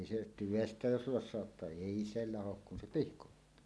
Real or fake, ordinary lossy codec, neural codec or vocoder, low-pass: real; none; none; none